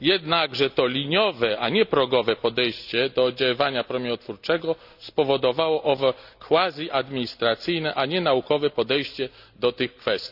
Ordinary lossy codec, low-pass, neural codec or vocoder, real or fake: none; 5.4 kHz; none; real